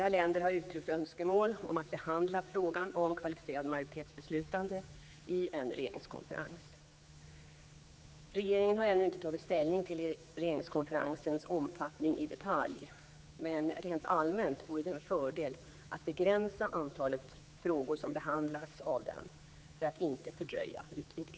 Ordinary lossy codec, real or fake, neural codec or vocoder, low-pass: none; fake; codec, 16 kHz, 4 kbps, X-Codec, HuBERT features, trained on general audio; none